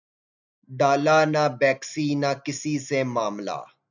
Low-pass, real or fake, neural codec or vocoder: 7.2 kHz; real; none